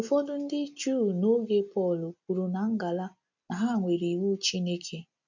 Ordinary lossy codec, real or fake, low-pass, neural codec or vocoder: none; real; 7.2 kHz; none